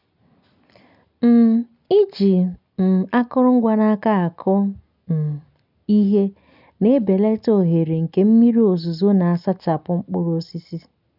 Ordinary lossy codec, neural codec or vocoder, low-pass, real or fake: none; none; 5.4 kHz; real